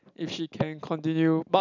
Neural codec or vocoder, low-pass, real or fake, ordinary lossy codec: none; 7.2 kHz; real; none